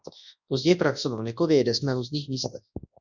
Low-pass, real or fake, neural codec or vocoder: 7.2 kHz; fake; codec, 24 kHz, 0.9 kbps, WavTokenizer, large speech release